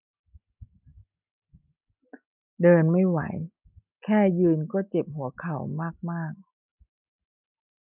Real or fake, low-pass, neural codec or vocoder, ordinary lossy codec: real; 3.6 kHz; none; none